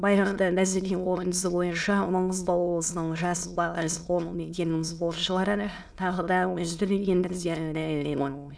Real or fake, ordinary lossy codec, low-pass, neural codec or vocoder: fake; none; none; autoencoder, 22.05 kHz, a latent of 192 numbers a frame, VITS, trained on many speakers